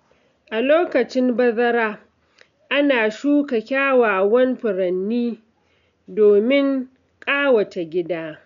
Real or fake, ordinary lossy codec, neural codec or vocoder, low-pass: real; none; none; 7.2 kHz